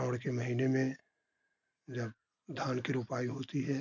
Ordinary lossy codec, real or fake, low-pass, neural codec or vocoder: none; real; 7.2 kHz; none